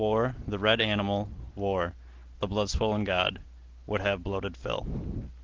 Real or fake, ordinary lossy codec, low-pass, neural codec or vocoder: real; Opus, 16 kbps; 7.2 kHz; none